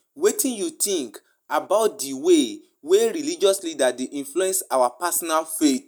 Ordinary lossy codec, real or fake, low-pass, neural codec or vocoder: none; real; none; none